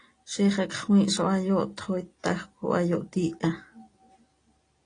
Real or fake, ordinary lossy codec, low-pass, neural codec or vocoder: real; AAC, 32 kbps; 9.9 kHz; none